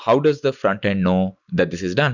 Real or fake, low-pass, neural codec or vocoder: real; 7.2 kHz; none